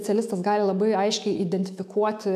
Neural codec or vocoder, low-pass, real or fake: autoencoder, 48 kHz, 128 numbers a frame, DAC-VAE, trained on Japanese speech; 14.4 kHz; fake